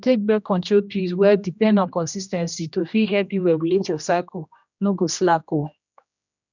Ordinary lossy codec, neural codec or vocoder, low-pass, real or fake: none; codec, 16 kHz, 1 kbps, X-Codec, HuBERT features, trained on general audio; 7.2 kHz; fake